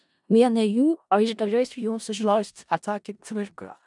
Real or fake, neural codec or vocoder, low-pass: fake; codec, 16 kHz in and 24 kHz out, 0.4 kbps, LongCat-Audio-Codec, four codebook decoder; 10.8 kHz